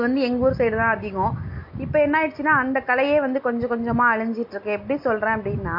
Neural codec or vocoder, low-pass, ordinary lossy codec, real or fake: none; 5.4 kHz; MP3, 32 kbps; real